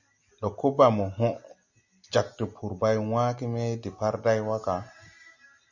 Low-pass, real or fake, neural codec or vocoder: 7.2 kHz; real; none